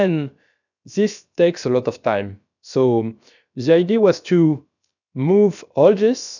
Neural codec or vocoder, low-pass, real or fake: codec, 16 kHz, about 1 kbps, DyCAST, with the encoder's durations; 7.2 kHz; fake